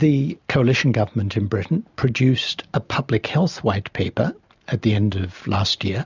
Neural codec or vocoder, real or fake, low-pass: none; real; 7.2 kHz